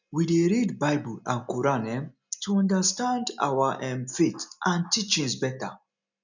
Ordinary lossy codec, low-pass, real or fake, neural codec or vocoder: none; 7.2 kHz; real; none